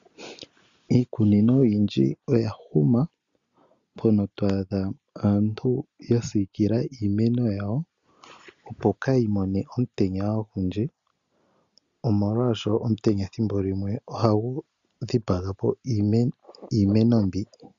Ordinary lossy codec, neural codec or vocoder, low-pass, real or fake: Opus, 64 kbps; none; 7.2 kHz; real